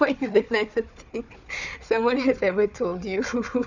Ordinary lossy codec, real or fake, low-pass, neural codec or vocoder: none; fake; 7.2 kHz; codec, 16 kHz, 4 kbps, FunCodec, trained on Chinese and English, 50 frames a second